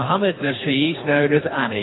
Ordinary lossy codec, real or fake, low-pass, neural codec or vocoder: AAC, 16 kbps; fake; 7.2 kHz; codec, 24 kHz, 3 kbps, HILCodec